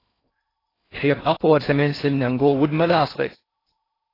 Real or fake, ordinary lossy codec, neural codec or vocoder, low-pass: fake; AAC, 24 kbps; codec, 16 kHz in and 24 kHz out, 0.6 kbps, FocalCodec, streaming, 2048 codes; 5.4 kHz